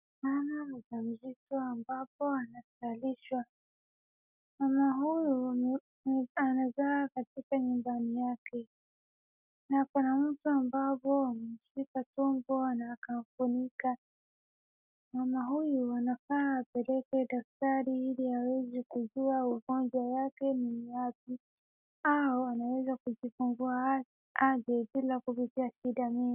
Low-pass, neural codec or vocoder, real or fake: 3.6 kHz; none; real